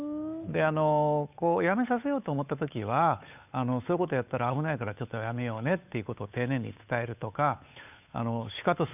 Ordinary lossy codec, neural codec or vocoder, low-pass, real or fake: none; codec, 16 kHz, 8 kbps, FunCodec, trained on Chinese and English, 25 frames a second; 3.6 kHz; fake